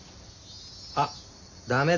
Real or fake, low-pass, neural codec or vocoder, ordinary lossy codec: real; 7.2 kHz; none; Opus, 64 kbps